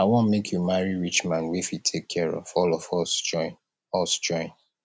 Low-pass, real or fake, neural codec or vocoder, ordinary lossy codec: none; real; none; none